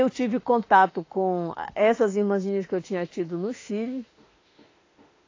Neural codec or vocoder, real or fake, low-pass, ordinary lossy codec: autoencoder, 48 kHz, 32 numbers a frame, DAC-VAE, trained on Japanese speech; fake; 7.2 kHz; AAC, 32 kbps